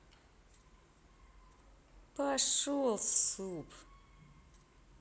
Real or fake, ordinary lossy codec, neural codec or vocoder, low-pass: real; none; none; none